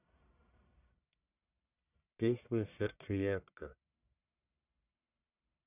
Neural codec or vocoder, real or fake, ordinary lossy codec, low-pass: codec, 44.1 kHz, 1.7 kbps, Pupu-Codec; fake; none; 3.6 kHz